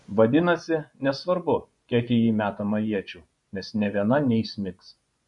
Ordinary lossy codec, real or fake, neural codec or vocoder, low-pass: MP3, 48 kbps; fake; vocoder, 24 kHz, 100 mel bands, Vocos; 10.8 kHz